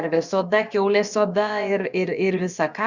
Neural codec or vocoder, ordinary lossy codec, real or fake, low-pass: codec, 16 kHz, about 1 kbps, DyCAST, with the encoder's durations; Opus, 64 kbps; fake; 7.2 kHz